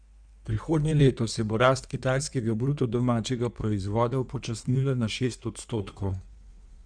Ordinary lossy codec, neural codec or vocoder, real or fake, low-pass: MP3, 96 kbps; codec, 16 kHz in and 24 kHz out, 1.1 kbps, FireRedTTS-2 codec; fake; 9.9 kHz